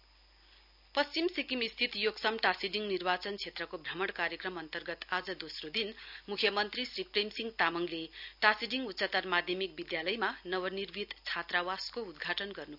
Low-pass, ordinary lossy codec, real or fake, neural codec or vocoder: 5.4 kHz; none; real; none